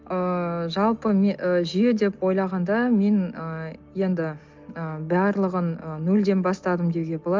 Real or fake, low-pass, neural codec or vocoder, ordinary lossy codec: real; 7.2 kHz; none; Opus, 32 kbps